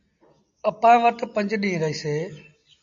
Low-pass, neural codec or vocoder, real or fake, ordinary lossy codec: 7.2 kHz; none; real; AAC, 64 kbps